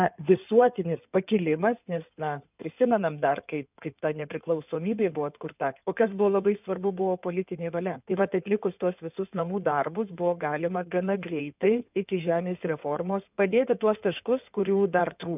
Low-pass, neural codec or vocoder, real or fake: 3.6 kHz; codec, 16 kHz in and 24 kHz out, 2.2 kbps, FireRedTTS-2 codec; fake